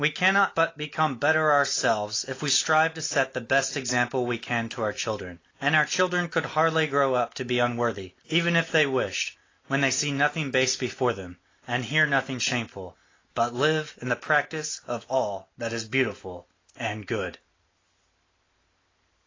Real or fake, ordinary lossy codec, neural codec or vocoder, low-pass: real; AAC, 32 kbps; none; 7.2 kHz